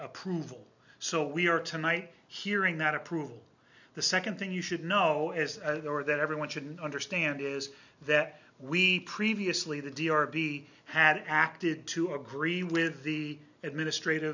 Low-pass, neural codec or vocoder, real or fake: 7.2 kHz; none; real